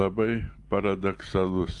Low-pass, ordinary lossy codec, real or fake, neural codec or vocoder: 10.8 kHz; Opus, 32 kbps; real; none